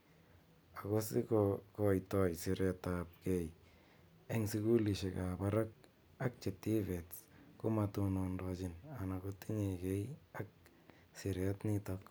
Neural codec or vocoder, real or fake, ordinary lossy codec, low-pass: none; real; none; none